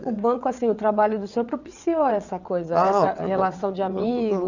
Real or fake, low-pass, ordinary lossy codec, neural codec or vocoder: fake; 7.2 kHz; none; vocoder, 22.05 kHz, 80 mel bands, WaveNeXt